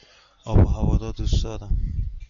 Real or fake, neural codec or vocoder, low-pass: real; none; 7.2 kHz